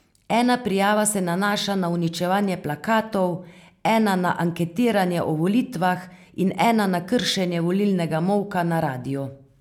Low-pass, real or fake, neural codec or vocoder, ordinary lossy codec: 19.8 kHz; real; none; none